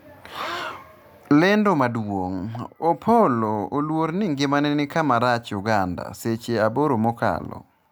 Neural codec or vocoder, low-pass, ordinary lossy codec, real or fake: none; none; none; real